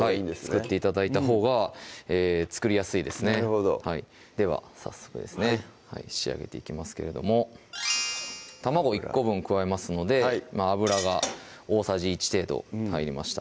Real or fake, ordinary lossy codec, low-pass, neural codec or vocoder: real; none; none; none